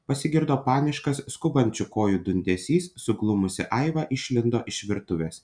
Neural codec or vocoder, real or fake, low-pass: none; real; 9.9 kHz